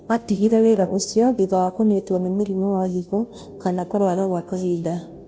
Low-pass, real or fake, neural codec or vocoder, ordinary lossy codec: none; fake; codec, 16 kHz, 0.5 kbps, FunCodec, trained on Chinese and English, 25 frames a second; none